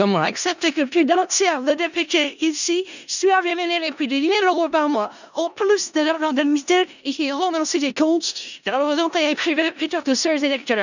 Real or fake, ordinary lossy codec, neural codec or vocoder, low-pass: fake; none; codec, 16 kHz in and 24 kHz out, 0.4 kbps, LongCat-Audio-Codec, four codebook decoder; 7.2 kHz